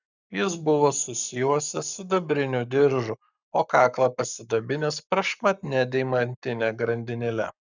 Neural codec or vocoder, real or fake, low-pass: codec, 44.1 kHz, 7.8 kbps, Pupu-Codec; fake; 7.2 kHz